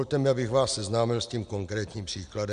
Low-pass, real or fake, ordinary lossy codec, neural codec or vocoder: 9.9 kHz; real; MP3, 96 kbps; none